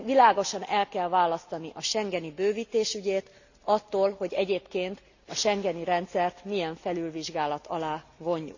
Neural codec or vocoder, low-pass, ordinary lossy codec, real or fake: none; 7.2 kHz; none; real